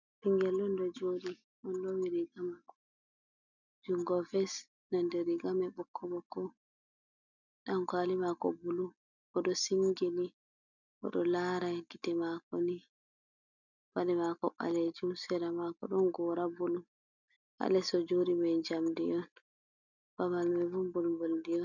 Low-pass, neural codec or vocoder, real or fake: 7.2 kHz; none; real